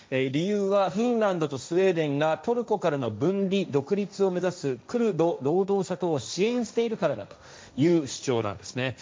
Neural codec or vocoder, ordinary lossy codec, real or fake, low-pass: codec, 16 kHz, 1.1 kbps, Voila-Tokenizer; none; fake; none